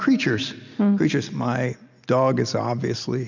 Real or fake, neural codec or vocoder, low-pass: real; none; 7.2 kHz